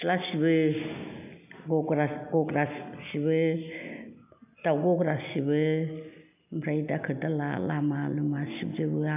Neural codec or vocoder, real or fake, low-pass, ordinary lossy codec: none; real; 3.6 kHz; none